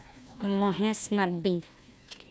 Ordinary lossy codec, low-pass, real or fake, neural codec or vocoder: none; none; fake; codec, 16 kHz, 1 kbps, FunCodec, trained on Chinese and English, 50 frames a second